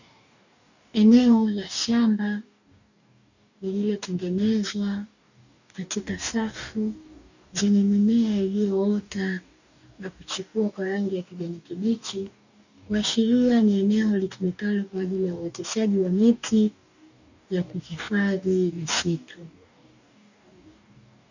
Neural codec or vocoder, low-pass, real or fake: codec, 44.1 kHz, 2.6 kbps, DAC; 7.2 kHz; fake